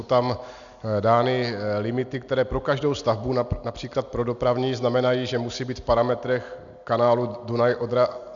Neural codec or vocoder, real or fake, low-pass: none; real; 7.2 kHz